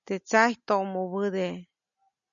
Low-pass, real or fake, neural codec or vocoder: 7.2 kHz; real; none